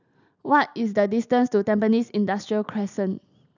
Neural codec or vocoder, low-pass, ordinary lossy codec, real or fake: none; 7.2 kHz; none; real